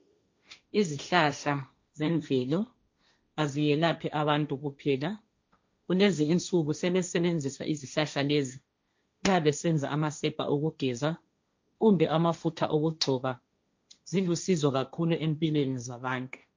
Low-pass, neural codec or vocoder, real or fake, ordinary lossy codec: 7.2 kHz; codec, 16 kHz, 1.1 kbps, Voila-Tokenizer; fake; MP3, 48 kbps